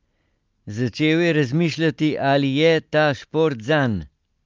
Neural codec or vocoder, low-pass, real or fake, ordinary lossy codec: none; 7.2 kHz; real; Opus, 24 kbps